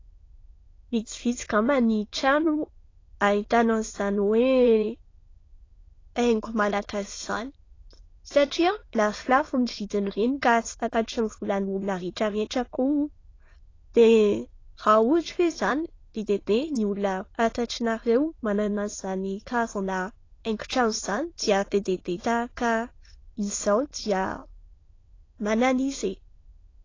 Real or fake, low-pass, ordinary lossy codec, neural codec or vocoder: fake; 7.2 kHz; AAC, 32 kbps; autoencoder, 22.05 kHz, a latent of 192 numbers a frame, VITS, trained on many speakers